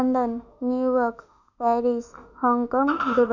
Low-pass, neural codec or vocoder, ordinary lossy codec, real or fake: 7.2 kHz; autoencoder, 48 kHz, 32 numbers a frame, DAC-VAE, trained on Japanese speech; none; fake